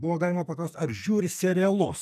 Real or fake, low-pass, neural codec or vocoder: fake; 14.4 kHz; codec, 44.1 kHz, 2.6 kbps, SNAC